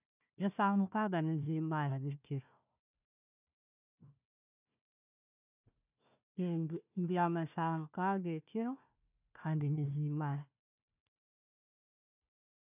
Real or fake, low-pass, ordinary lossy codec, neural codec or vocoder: fake; 3.6 kHz; none; codec, 16 kHz, 1 kbps, FunCodec, trained on Chinese and English, 50 frames a second